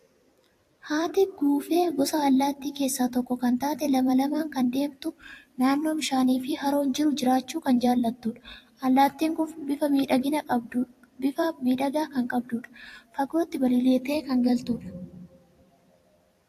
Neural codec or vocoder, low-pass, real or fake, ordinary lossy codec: vocoder, 44.1 kHz, 128 mel bands every 256 samples, BigVGAN v2; 14.4 kHz; fake; AAC, 64 kbps